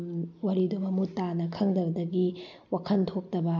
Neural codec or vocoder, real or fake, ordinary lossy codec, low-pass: none; real; none; 7.2 kHz